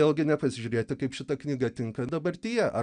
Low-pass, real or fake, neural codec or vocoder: 9.9 kHz; real; none